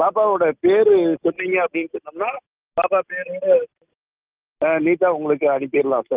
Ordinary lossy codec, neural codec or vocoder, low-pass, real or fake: Opus, 24 kbps; none; 3.6 kHz; real